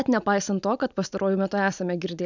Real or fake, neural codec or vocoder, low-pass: real; none; 7.2 kHz